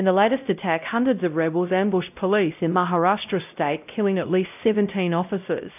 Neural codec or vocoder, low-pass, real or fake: codec, 16 kHz, 0.5 kbps, X-Codec, WavLM features, trained on Multilingual LibriSpeech; 3.6 kHz; fake